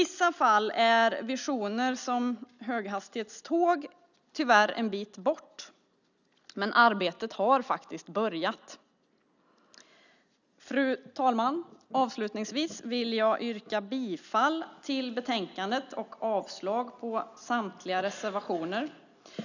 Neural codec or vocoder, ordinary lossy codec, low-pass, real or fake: none; none; 7.2 kHz; real